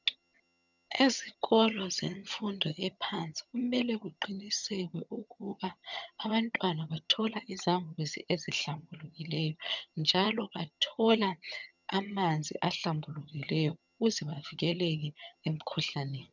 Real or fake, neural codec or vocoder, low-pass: fake; vocoder, 22.05 kHz, 80 mel bands, HiFi-GAN; 7.2 kHz